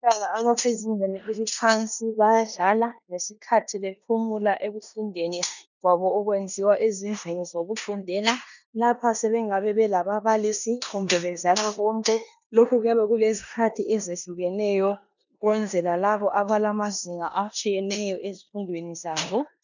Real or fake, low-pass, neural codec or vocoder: fake; 7.2 kHz; codec, 16 kHz in and 24 kHz out, 0.9 kbps, LongCat-Audio-Codec, four codebook decoder